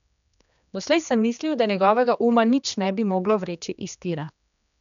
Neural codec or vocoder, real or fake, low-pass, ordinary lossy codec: codec, 16 kHz, 2 kbps, X-Codec, HuBERT features, trained on general audio; fake; 7.2 kHz; none